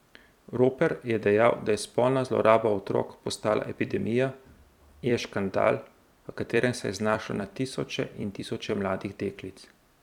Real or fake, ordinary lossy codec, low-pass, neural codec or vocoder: fake; none; 19.8 kHz; vocoder, 44.1 kHz, 128 mel bands every 256 samples, BigVGAN v2